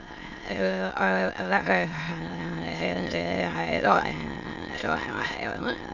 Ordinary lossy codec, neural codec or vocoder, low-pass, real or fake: none; autoencoder, 22.05 kHz, a latent of 192 numbers a frame, VITS, trained on many speakers; 7.2 kHz; fake